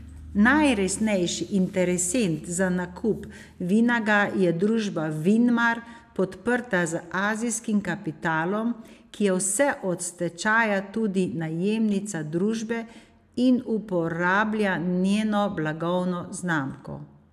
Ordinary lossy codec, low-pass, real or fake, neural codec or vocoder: AAC, 96 kbps; 14.4 kHz; real; none